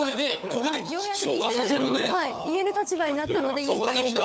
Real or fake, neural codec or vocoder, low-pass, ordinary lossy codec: fake; codec, 16 kHz, 4 kbps, FunCodec, trained on LibriTTS, 50 frames a second; none; none